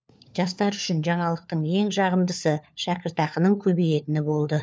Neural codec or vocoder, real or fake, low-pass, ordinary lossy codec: codec, 16 kHz, 4 kbps, FunCodec, trained on LibriTTS, 50 frames a second; fake; none; none